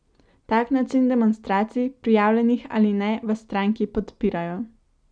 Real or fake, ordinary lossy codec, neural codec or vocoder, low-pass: real; none; none; 9.9 kHz